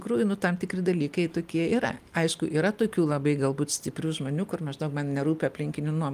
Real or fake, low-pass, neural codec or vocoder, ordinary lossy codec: real; 14.4 kHz; none; Opus, 32 kbps